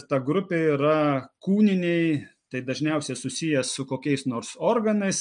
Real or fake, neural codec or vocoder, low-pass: real; none; 9.9 kHz